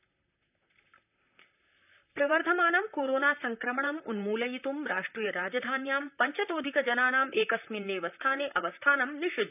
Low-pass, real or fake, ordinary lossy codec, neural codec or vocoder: 3.6 kHz; fake; none; vocoder, 44.1 kHz, 128 mel bands, Pupu-Vocoder